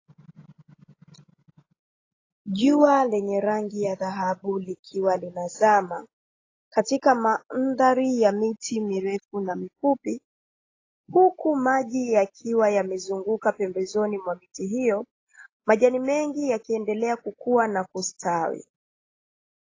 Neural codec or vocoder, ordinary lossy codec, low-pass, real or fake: none; AAC, 32 kbps; 7.2 kHz; real